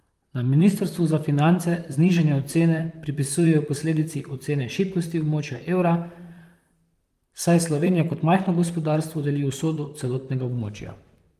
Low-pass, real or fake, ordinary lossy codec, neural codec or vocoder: 14.4 kHz; fake; Opus, 32 kbps; vocoder, 44.1 kHz, 128 mel bands, Pupu-Vocoder